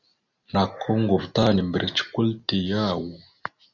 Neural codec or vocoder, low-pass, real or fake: none; 7.2 kHz; real